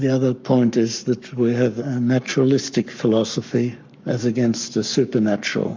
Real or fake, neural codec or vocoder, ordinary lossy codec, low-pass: fake; codec, 44.1 kHz, 7.8 kbps, Pupu-Codec; MP3, 64 kbps; 7.2 kHz